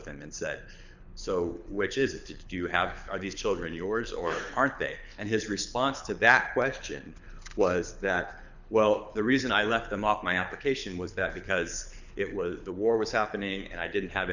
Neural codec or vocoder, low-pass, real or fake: codec, 24 kHz, 6 kbps, HILCodec; 7.2 kHz; fake